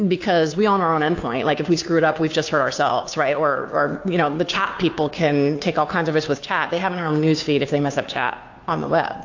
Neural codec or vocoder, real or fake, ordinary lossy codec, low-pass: codec, 16 kHz, 2 kbps, FunCodec, trained on Chinese and English, 25 frames a second; fake; AAC, 48 kbps; 7.2 kHz